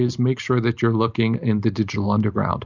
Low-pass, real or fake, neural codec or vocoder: 7.2 kHz; real; none